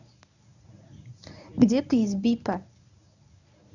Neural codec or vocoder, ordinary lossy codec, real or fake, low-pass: codec, 24 kHz, 0.9 kbps, WavTokenizer, medium speech release version 1; none; fake; 7.2 kHz